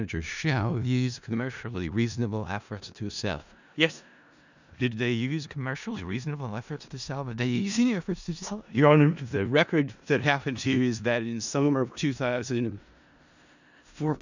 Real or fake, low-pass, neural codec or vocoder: fake; 7.2 kHz; codec, 16 kHz in and 24 kHz out, 0.4 kbps, LongCat-Audio-Codec, four codebook decoder